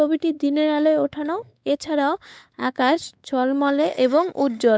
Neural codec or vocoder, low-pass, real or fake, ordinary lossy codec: codec, 16 kHz, 4 kbps, X-Codec, WavLM features, trained on Multilingual LibriSpeech; none; fake; none